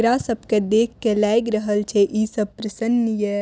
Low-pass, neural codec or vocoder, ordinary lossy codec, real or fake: none; none; none; real